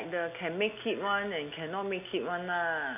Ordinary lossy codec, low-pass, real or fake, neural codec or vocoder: none; 3.6 kHz; real; none